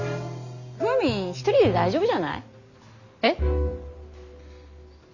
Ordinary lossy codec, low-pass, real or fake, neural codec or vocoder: none; 7.2 kHz; real; none